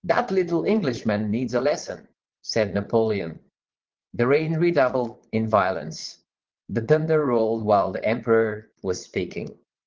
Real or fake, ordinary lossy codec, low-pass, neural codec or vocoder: fake; Opus, 16 kbps; 7.2 kHz; vocoder, 22.05 kHz, 80 mel bands, Vocos